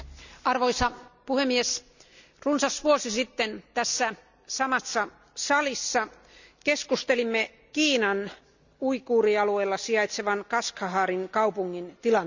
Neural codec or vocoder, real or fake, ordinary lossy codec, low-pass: none; real; none; 7.2 kHz